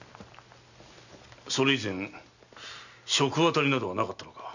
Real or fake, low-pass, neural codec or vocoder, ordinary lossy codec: real; 7.2 kHz; none; none